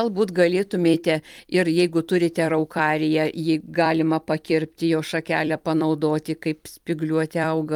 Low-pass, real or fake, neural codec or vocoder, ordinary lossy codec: 19.8 kHz; fake; vocoder, 44.1 kHz, 128 mel bands every 256 samples, BigVGAN v2; Opus, 32 kbps